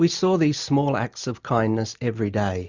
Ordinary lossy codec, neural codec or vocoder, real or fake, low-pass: Opus, 64 kbps; none; real; 7.2 kHz